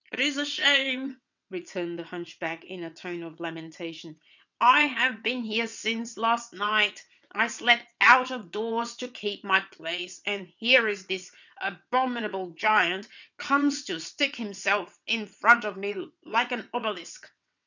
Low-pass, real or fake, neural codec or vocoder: 7.2 kHz; fake; vocoder, 22.05 kHz, 80 mel bands, WaveNeXt